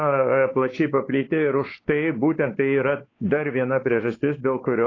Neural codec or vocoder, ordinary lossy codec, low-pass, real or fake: codec, 16 kHz, 4 kbps, X-Codec, WavLM features, trained on Multilingual LibriSpeech; AAC, 32 kbps; 7.2 kHz; fake